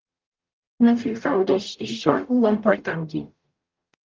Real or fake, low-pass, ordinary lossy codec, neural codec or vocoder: fake; 7.2 kHz; Opus, 24 kbps; codec, 44.1 kHz, 0.9 kbps, DAC